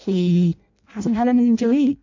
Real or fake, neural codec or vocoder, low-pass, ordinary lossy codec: fake; codec, 16 kHz in and 24 kHz out, 0.6 kbps, FireRedTTS-2 codec; 7.2 kHz; MP3, 48 kbps